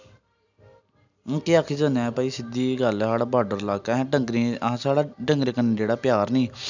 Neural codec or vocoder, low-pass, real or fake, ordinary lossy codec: none; 7.2 kHz; real; none